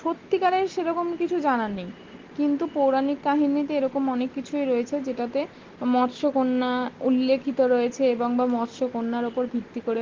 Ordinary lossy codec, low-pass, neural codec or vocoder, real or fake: Opus, 16 kbps; 7.2 kHz; none; real